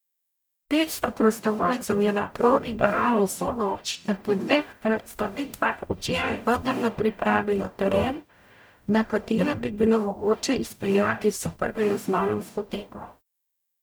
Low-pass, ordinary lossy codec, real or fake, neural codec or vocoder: none; none; fake; codec, 44.1 kHz, 0.9 kbps, DAC